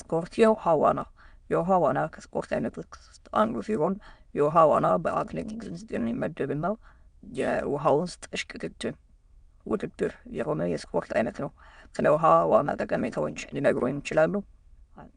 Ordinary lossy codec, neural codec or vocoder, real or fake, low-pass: Opus, 64 kbps; autoencoder, 22.05 kHz, a latent of 192 numbers a frame, VITS, trained on many speakers; fake; 9.9 kHz